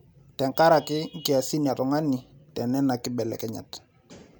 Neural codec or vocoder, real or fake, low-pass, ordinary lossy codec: none; real; none; none